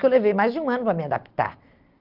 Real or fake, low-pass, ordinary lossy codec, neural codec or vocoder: real; 5.4 kHz; Opus, 24 kbps; none